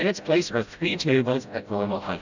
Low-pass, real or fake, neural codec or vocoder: 7.2 kHz; fake; codec, 16 kHz, 0.5 kbps, FreqCodec, smaller model